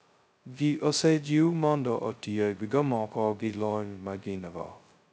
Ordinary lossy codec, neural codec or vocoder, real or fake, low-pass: none; codec, 16 kHz, 0.2 kbps, FocalCodec; fake; none